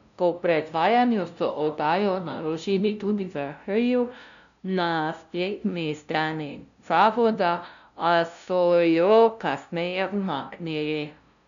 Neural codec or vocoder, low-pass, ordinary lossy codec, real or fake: codec, 16 kHz, 0.5 kbps, FunCodec, trained on LibriTTS, 25 frames a second; 7.2 kHz; none; fake